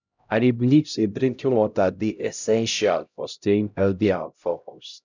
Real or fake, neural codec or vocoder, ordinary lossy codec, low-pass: fake; codec, 16 kHz, 0.5 kbps, X-Codec, HuBERT features, trained on LibriSpeech; none; 7.2 kHz